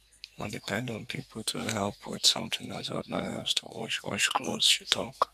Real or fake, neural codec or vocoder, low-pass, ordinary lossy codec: fake; codec, 44.1 kHz, 2.6 kbps, SNAC; 14.4 kHz; none